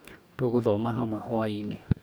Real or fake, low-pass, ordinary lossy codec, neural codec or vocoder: fake; none; none; codec, 44.1 kHz, 2.6 kbps, DAC